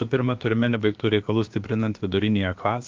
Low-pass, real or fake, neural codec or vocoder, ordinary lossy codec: 7.2 kHz; fake; codec, 16 kHz, about 1 kbps, DyCAST, with the encoder's durations; Opus, 32 kbps